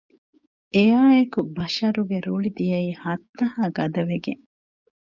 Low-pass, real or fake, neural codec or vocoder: 7.2 kHz; fake; codec, 16 kHz, 6 kbps, DAC